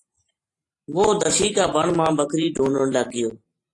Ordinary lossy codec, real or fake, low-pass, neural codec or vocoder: AAC, 48 kbps; fake; 10.8 kHz; vocoder, 44.1 kHz, 128 mel bands every 512 samples, BigVGAN v2